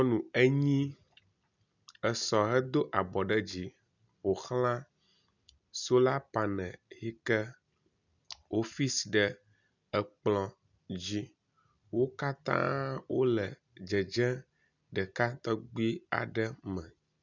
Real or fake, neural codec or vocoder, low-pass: real; none; 7.2 kHz